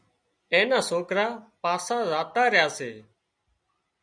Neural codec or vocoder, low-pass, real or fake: none; 9.9 kHz; real